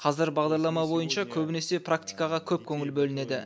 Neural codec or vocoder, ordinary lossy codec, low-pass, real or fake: none; none; none; real